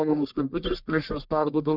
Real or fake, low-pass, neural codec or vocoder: fake; 5.4 kHz; codec, 44.1 kHz, 1.7 kbps, Pupu-Codec